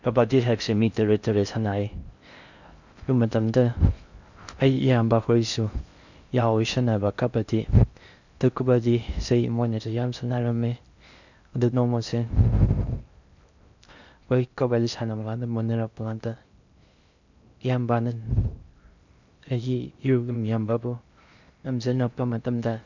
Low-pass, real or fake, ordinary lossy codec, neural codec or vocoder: 7.2 kHz; fake; none; codec, 16 kHz in and 24 kHz out, 0.6 kbps, FocalCodec, streaming, 4096 codes